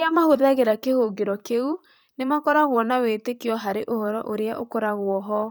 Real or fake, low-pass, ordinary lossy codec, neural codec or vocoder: fake; none; none; vocoder, 44.1 kHz, 128 mel bands, Pupu-Vocoder